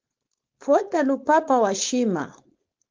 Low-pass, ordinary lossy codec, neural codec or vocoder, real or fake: 7.2 kHz; Opus, 32 kbps; codec, 16 kHz, 4.8 kbps, FACodec; fake